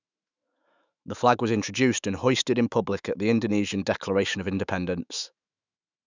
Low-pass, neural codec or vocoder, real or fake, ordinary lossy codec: 7.2 kHz; autoencoder, 48 kHz, 128 numbers a frame, DAC-VAE, trained on Japanese speech; fake; none